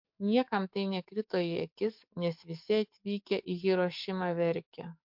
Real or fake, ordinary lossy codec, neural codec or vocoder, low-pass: fake; MP3, 48 kbps; codec, 44.1 kHz, 7.8 kbps, DAC; 5.4 kHz